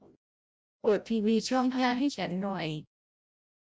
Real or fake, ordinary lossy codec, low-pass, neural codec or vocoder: fake; none; none; codec, 16 kHz, 0.5 kbps, FreqCodec, larger model